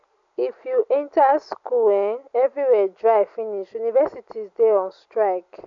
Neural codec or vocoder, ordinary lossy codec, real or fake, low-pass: none; AAC, 64 kbps; real; 7.2 kHz